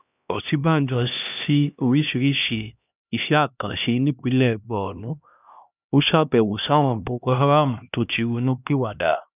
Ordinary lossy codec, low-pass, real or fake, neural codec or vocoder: none; 3.6 kHz; fake; codec, 16 kHz, 1 kbps, X-Codec, HuBERT features, trained on LibriSpeech